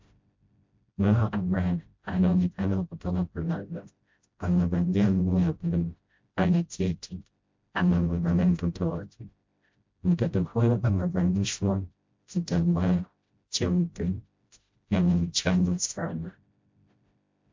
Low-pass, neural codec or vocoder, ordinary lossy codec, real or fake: 7.2 kHz; codec, 16 kHz, 0.5 kbps, FreqCodec, smaller model; MP3, 48 kbps; fake